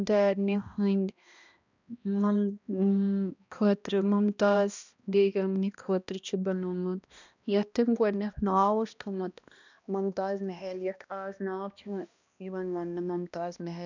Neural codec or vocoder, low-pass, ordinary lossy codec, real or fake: codec, 16 kHz, 1 kbps, X-Codec, HuBERT features, trained on balanced general audio; 7.2 kHz; none; fake